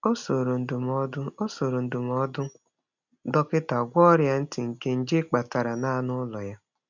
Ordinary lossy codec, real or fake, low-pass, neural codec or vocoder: none; real; 7.2 kHz; none